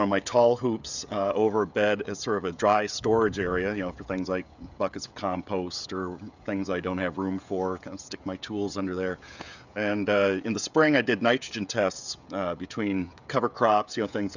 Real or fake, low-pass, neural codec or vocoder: fake; 7.2 kHz; codec, 16 kHz, 16 kbps, FreqCodec, smaller model